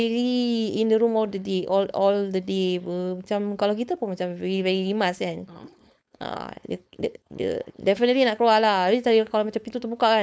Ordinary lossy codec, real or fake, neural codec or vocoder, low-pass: none; fake; codec, 16 kHz, 4.8 kbps, FACodec; none